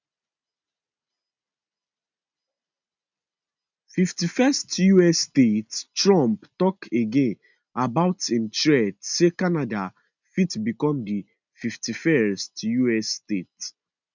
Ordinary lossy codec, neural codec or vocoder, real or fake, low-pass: none; none; real; 7.2 kHz